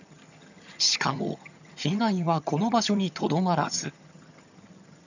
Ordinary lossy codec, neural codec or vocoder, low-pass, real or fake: none; vocoder, 22.05 kHz, 80 mel bands, HiFi-GAN; 7.2 kHz; fake